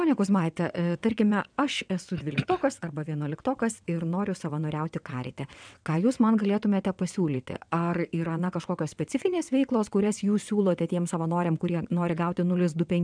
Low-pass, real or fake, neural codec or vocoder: 9.9 kHz; fake; vocoder, 22.05 kHz, 80 mel bands, WaveNeXt